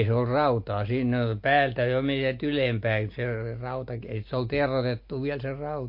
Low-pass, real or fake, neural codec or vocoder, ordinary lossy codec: 5.4 kHz; real; none; MP3, 32 kbps